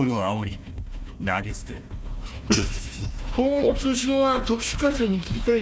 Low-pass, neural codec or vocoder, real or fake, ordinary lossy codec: none; codec, 16 kHz, 1 kbps, FunCodec, trained on Chinese and English, 50 frames a second; fake; none